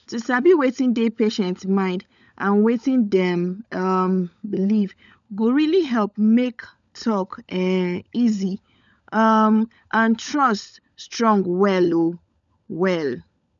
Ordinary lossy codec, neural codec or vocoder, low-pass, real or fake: none; codec, 16 kHz, 16 kbps, FunCodec, trained on LibriTTS, 50 frames a second; 7.2 kHz; fake